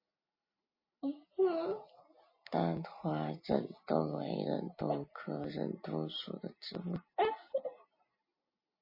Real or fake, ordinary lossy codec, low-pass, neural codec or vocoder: real; MP3, 24 kbps; 5.4 kHz; none